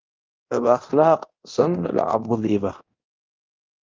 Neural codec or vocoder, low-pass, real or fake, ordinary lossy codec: codec, 24 kHz, 0.9 kbps, DualCodec; 7.2 kHz; fake; Opus, 24 kbps